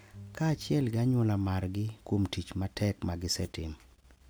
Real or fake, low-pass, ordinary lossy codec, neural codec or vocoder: real; none; none; none